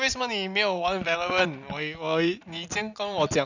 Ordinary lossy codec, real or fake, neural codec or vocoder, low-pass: none; real; none; 7.2 kHz